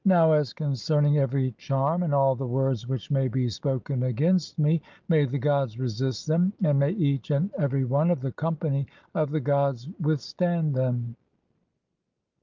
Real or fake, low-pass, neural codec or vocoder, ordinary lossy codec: real; 7.2 kHz; none; Opus, 24 kbps